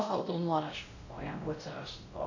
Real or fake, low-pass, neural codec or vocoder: fake; 7.2 kHz; codec, 16 kHz, 0.5 kbps, X-Codec, WavLM features, trained on Multilingual LibriSpeech